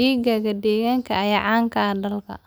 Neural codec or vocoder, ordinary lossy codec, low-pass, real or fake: none; none; none; real